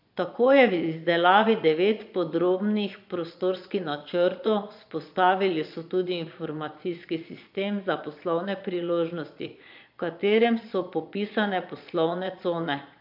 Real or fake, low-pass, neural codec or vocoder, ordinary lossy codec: fake; 5.4 kHz; vocoder, 22.05 kHz, 80 mel bands, Vocos; AAC, 48 kbps